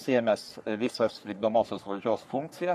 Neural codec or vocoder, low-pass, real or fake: codec, 44.1 kHz, 3.4 kbps, Pupu-Codec; 14.4 kHz; fake